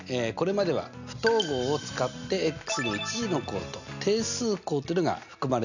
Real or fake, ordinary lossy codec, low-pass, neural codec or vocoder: real; none; 7.2 kHz; none